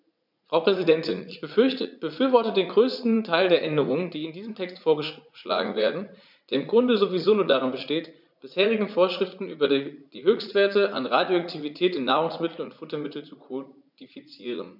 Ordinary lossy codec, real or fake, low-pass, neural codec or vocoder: none; fake; 5.4 kHz; vocoder, 44.1 kHz, 80 mel bands, Vocos